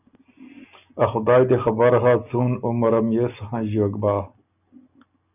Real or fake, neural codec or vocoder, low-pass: real; none; 3.6 kHz